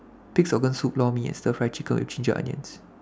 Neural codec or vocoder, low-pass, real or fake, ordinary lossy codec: none; none; real; none